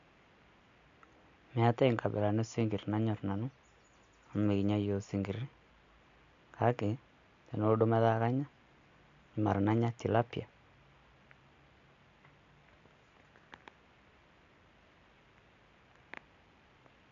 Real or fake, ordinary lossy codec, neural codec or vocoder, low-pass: real; AAC, 64 kbps; none; 7.2 kHz